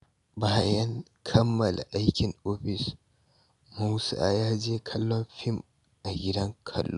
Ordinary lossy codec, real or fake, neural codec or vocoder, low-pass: none; real; none; 10.8 kHz